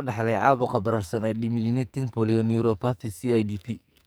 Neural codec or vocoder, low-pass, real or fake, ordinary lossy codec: codec, 44.1 kHz, 2.6 kbps, SNAC; none; fake; none